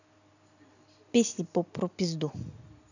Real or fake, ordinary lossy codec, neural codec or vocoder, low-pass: real; none; none; 7.2 kHz